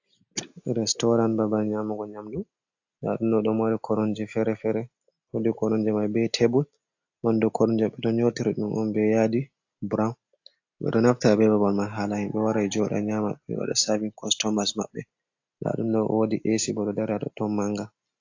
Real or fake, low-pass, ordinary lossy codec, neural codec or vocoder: real; 7.2 kHz; AAC, 48 kbps; none